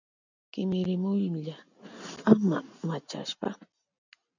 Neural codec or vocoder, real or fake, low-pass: none; real; 7.2 kHz